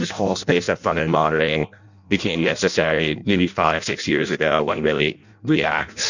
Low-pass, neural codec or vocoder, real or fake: 7.2 kHz; codec, 16 kHz in and 24 kHz out, 0.6 kbps, FireRedTTS-2 codec; fake